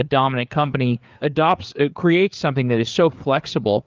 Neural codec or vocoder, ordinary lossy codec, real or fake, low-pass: codec, 16 kHz, 4 kbps, FreqCodec, larger model; Opus, 32 kbps; fake; 7.2 kHz